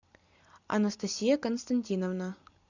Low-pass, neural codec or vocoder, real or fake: 7.2 kHz; none; real